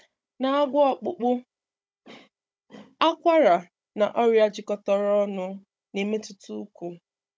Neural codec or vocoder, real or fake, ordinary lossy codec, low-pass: codec, 16 kHz, 16 kbps, FunCodec, trained on Chinese and English, 50 frames a second; fake; none; none